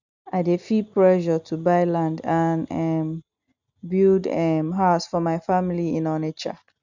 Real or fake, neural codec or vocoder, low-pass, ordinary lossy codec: real; none; 7.2 kHz; none